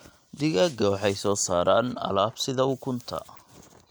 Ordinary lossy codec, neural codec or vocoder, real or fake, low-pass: none; none; real; none